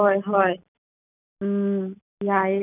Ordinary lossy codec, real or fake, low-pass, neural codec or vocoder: none; real; 3.6 kHz; none